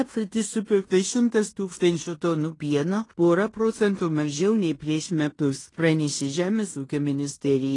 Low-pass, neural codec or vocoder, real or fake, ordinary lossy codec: 10.8 kHz; codec, 16 kHz in and 24 kHz out, 0.9 kbps, LongCat-Audio-Codec, fine tuned four codebook decoder; fake; AAC, 32 kbps